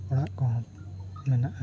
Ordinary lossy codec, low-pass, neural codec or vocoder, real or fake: none; none; none; real